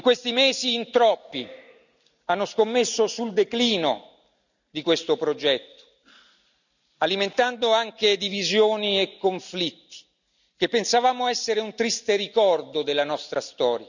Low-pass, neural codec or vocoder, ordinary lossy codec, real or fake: 7.2 kHz; none; none; real